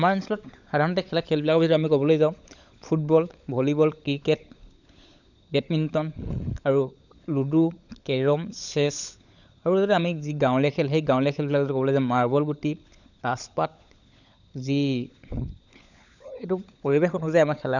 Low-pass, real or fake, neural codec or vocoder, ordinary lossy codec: 7.2 kHz; fake; codec, 16 kHz, 16 kbps, FunCodec, trained on LibriTTS, 50 frames a second; none